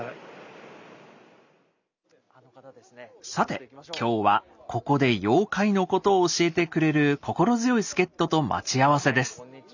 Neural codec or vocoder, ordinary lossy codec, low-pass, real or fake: none; none; 7.2 kHz; real